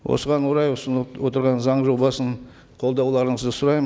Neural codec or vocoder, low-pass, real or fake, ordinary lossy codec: none; none; real; none